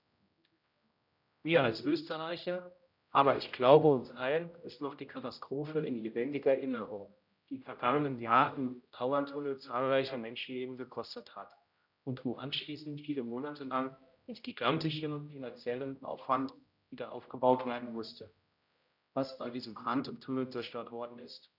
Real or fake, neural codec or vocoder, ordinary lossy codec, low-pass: fake; codec, 16 kHz, 0.5 kbps, X-Codec, HuBERT features, trained on general audio; none; 5.4 kHz